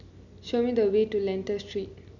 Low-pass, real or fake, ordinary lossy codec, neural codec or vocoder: 7.2 kHz; real; none; none